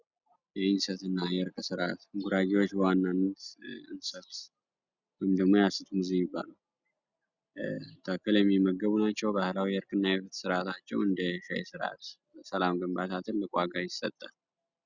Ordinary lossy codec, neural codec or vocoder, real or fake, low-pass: Opus, 64 kbps; none; real; 7.2 kHz